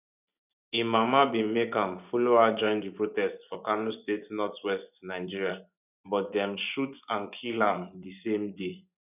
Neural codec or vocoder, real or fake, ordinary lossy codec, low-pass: vocoder, 24 kHz, 100 mel bands, Vocos; fake; none; 3.6 kHz